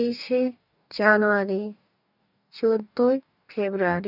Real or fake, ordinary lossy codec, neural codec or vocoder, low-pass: fake; none; codec, 44.1 kHz, 2.6 kbps, DAC; 5.4 kHz